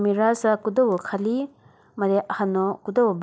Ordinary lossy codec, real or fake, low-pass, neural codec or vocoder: none; real; none; none